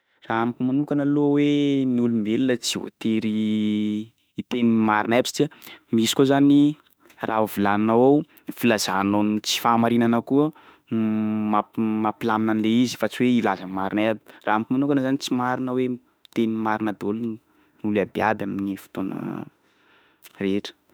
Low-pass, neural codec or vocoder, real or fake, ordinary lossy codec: none; autoencoder, 48 kHz, 32 numbers a frame, DAC-VAE, trained on Japanese speech; fake; none